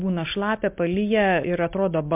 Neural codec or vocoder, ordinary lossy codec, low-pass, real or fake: none; MP3, 32 kbps; 3.6 kHz; real